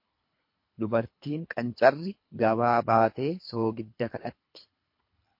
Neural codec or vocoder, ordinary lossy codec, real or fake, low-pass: codec, 24 kHz, 3 kbps, HILCodec; MP3, 32 kbps; fake; 5.4 kHz